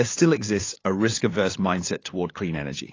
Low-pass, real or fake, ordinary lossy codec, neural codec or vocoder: 7.2 kHz; fake; AAC, 32 kbps; codec, 16 kHz, 8 kbps, FunCodec, trained on LibriTTS, 25 frames a second